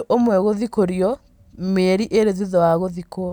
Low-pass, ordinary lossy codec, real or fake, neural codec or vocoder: 19.8 kHz; none; real; none